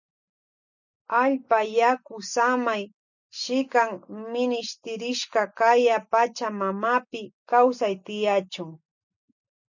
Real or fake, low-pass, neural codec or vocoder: real; 7.2 kHz; none